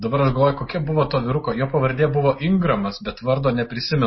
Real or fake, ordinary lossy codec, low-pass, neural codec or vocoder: real; MP3, 24 kbps; 7.2 kHz; none